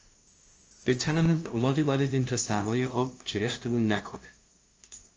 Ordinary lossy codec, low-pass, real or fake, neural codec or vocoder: Opus, 24 kbps; 7.2 kHz; fake; codec, 16 kHz, 0.5 kbps, FunCodec, trained on LibriTTS, 25 frames a second